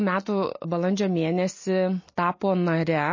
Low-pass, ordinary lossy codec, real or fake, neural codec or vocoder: 7.2 kHz; MP3, 32 kbps; real; none